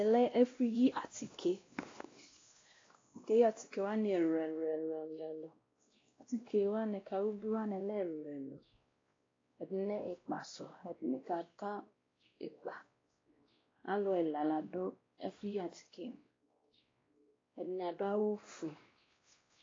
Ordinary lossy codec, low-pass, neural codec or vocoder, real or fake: AAC, 32 kbps; 7.2 kHz; codec, 16 kHz, 1 kbps, X-Codec, WavLM features, trained on Multilingual LibriSpeech; fake